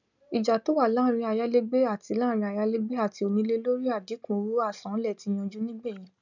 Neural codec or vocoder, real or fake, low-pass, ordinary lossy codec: none; real; 7.2 kHz; none